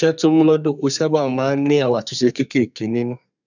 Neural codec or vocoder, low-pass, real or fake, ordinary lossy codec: codec, 32 kHz, 1.9 kbps, SNAC; 7.2 kHz; fake; none